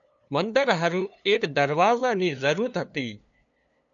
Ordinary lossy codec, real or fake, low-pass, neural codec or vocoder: MP3, 96 kbps; fake; 7.2 kHz; codec, 16 kHz, 2 kbps, FunCodec, trained on LibriTTS, 25 frames a second